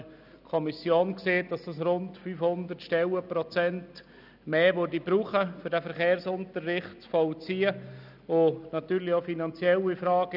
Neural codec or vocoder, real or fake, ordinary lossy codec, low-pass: none; real; none; 5.4 kHz